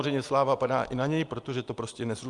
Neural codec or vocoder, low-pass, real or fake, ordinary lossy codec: none; 10.8 kHz; real; Opus, 32 kbps